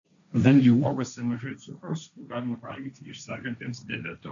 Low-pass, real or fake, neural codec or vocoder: 7.2 kHz; fake; codec, 16 kHz, 1.1 kbps, Voila-Tokenizer